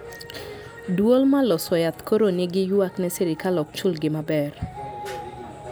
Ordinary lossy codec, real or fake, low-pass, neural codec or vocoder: none; real; none; none